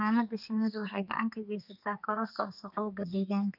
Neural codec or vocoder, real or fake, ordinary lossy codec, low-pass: codec, 32 kHz, 1.9 kbps, SNAC; fake; none; 5.4 kHz